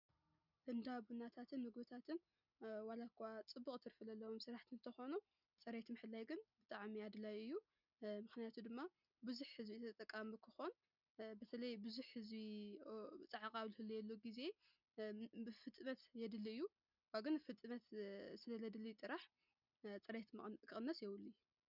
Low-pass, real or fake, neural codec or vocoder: 5.4 kHz; real; none